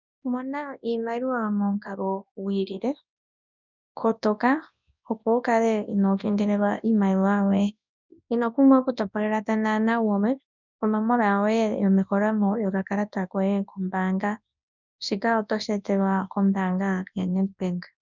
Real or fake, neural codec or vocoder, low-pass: fake; codec, 24 kHz, 0.9 kbps, WavTokenizer, large speech release; 7.2 kHz